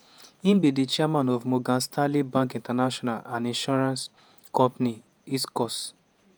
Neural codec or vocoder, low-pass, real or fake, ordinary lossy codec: vocoder, 48 kHz, 128 mel bands, Vocos; none; fake; none